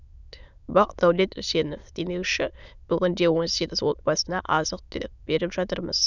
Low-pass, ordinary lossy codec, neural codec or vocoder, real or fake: 7.2 kHz; none; autoencoder, 22.05 kHz, a latent of 192 numbers a frame, VITS, trained on many speakers; fake